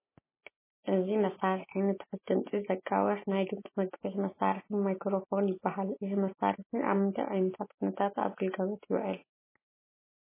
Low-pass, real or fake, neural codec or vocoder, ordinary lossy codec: 3.6 kHz; real; none; MP3, 16 kbps